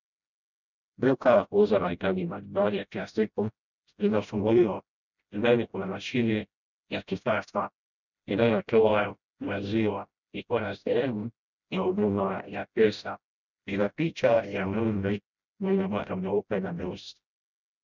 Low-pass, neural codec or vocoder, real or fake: 7.2 kHz; codec, 16 kHz, 0.5 kbps, FreqCodec, smaller model; fake